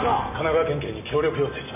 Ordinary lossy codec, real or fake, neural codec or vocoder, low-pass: none; real; none; 3.6 kHz